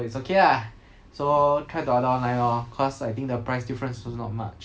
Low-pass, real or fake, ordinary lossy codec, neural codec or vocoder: none; real; none; none